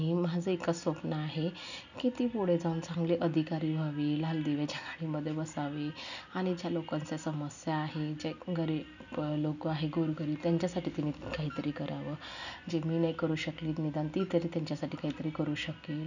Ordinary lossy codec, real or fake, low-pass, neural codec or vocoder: none; real; 7.2 kHz; none